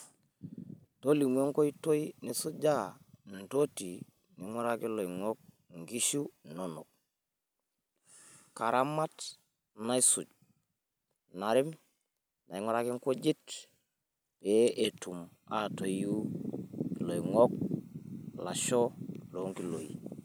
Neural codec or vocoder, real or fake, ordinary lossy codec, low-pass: none; real; none; none